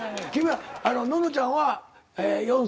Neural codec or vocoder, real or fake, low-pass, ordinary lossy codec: none; real; none; none